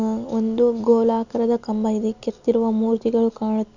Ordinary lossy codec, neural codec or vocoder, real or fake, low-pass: none; none; real; 7.2 kHz